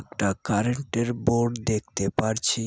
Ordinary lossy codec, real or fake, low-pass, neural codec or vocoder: none; real; none; none